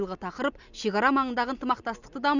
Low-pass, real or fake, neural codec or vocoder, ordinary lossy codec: 7.2 kHz; real; none; none